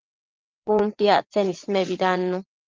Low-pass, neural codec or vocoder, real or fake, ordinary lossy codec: 7.2 kHz; vocoder, 22.05 kHz, 80 mel bands, WaveNeXt; fake; Opus, 24 kbps